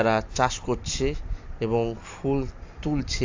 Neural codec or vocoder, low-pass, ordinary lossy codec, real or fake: vocoder, 44.1 kHz, 128 mel bands every 256 samples, BigVGAN v2; 7.2 kHz; AAC, 48 kbps; fake